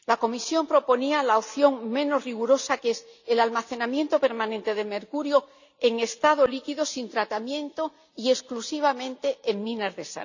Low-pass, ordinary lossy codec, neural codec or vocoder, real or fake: 7.2 kHz; none; none; real